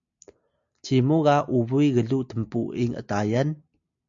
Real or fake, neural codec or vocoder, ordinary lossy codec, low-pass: real; none; MP3, 64 kbps; 7.2 kHz